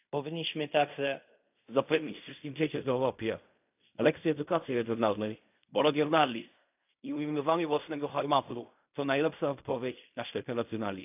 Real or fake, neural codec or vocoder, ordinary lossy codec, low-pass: fake; codec, 16 kHz in and 24 kHz out, 0.4 kbps, LongCat-Audio-Codec, fine tuned four codebook decoder; AAC, 32 kbps; 3.6 kHz